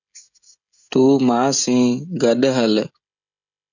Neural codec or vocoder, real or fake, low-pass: codec, 16 kHz, 16 kbps, FreqCodec, smaller model; fake; 7.2 kHz